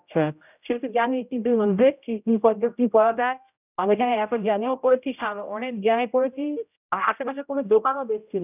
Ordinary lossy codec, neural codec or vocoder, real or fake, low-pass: none; codec, 16 kHz, 0.5 kbps, X-Codec, HuBERT features, trained on general audio; fake; 3.6 kHz